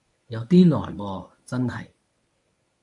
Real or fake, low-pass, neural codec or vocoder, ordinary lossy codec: fake; 10.8 kHz; codec, 24 kHz, 0.9 kbps, WavTokenizer, medium speech release version 1; AAC, 64 kbps